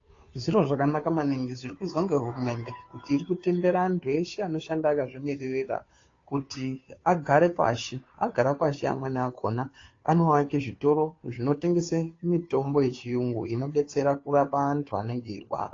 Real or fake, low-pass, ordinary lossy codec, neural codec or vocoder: fake; 7.2 kHz; AAC, 32 kbps; codec, 16 kHz, 2 kbps, FunCodec, trained on Chinese and English, 25 frames a second